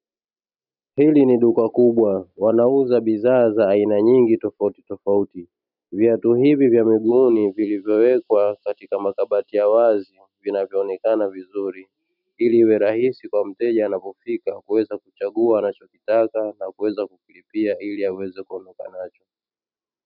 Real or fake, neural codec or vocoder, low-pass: real; none; 5.4 kHz